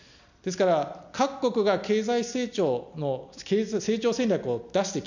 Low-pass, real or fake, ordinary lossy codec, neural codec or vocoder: 7.2 kHz; real; none; none